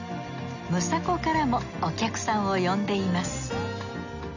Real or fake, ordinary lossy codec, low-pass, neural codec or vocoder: real; none; 7.2 kHz; none